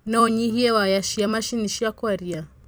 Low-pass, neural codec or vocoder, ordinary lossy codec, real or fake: none; vocoder, 44.1 kHz, 128 mel bands every 256 samples, BigVGAN v2; none; fake